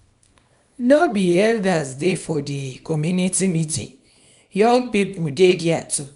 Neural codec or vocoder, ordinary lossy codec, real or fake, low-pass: codec, 24 kHz, 0.9 kbps, WavTokenizer, small release; none; fake; 10.8 kHz